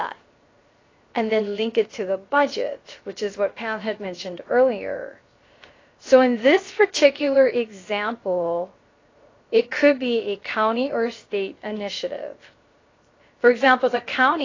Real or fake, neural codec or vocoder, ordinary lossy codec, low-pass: fake; codec, 16 kHz, 0.7 kbps, FocalCodec; AAC, 32 kbps; 7.2 kHz